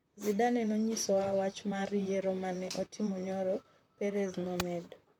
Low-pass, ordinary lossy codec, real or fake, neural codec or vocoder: 19.8 kHz; MP3, 96 kbps; fake; vocoder, 44.1 kHz, 128 mel bands, Pupu-Vocoder